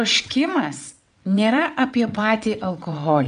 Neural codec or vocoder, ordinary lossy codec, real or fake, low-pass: vocoder, 22.05 kHz, 80 mel bands, WaveNeXt; AAC, 96 kbps; fake; 9.9 kHz